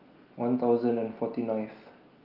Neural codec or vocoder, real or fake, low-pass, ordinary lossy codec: none; real; 5.4 kHz; Opus, 24 kbps